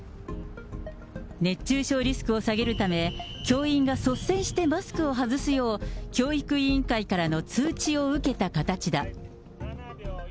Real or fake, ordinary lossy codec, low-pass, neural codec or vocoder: real; none; none; none